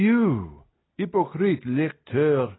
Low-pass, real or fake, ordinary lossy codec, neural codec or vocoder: 7.2 kHz; real; AAC, 16 kbps; none